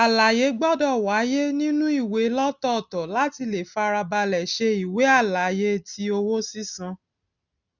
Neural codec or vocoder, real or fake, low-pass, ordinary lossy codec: none; real; 7.2 kHz; none